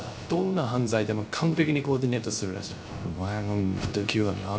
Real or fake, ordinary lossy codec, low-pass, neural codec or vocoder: fake; none; none; codec, 16 kHz, 0.3 kbps, FocalCodec